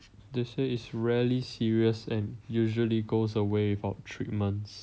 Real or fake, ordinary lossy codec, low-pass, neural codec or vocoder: real; none; none; none